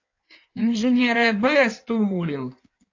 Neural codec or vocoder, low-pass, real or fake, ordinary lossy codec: codec, 16 kHz in and 24 kHz out, 1.1 kbps, FireRedTTS-2 codec; 7.2 kHz; fake; AAC, 48 kbps